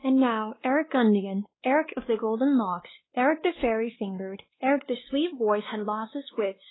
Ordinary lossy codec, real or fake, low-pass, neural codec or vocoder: AAC, 16 kbps; fake; 7.2 kHz; codec, 16 kHz, 2 kbps, X-Codec, WavLM features, trained on Multilingual LibriSpeech